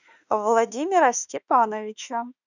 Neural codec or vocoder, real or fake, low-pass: autoencoder, 48 kHz, 32 numbers a frame, DAC-VAE, trained on Japanese speech; fake; 7.2 kHz